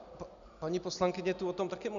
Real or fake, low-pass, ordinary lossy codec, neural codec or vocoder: real; 7.2 kHz; MP3, 96 kbps; none